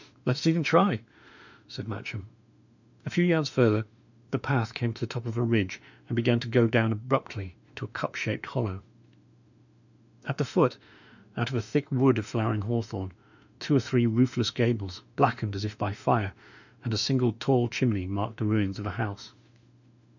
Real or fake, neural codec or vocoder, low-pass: fake; autoencoder, 48 kHz, 32 numbers a frame, DAC-VAE, trained on Japanese speech; 7.2 kHz